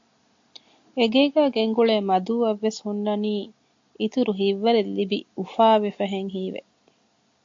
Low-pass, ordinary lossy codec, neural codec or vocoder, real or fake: 7.2 kHz; AAC, 48 kbps; none; real